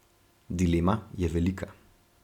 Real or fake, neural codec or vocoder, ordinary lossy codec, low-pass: real; none; none; 19.8 kHz